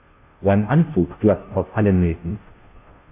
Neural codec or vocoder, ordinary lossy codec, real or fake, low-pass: codec, 16 kHz, 0.5 kbps, FunCodec, trained on Chinese and English, 25 frames a second; AAC, 32 kbps; fake; 3.6 kHz